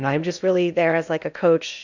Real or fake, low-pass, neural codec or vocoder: fake; 7.2 kHz; codec, 16 kHz in and 24 kHz out, 0.8 kbps, FocalCodec, streaming, 65536 codes